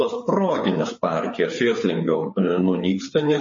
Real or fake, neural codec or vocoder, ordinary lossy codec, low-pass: fake; codec, 16 kHz, 4 kbps, FreqCodec, larger model; MP3, 32 kbps; 7.2 kHz